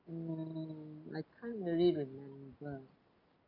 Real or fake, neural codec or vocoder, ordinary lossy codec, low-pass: real; none; none; 5.4 kHz